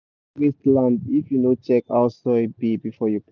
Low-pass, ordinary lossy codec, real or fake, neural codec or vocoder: 7.2 kHz; none; real; none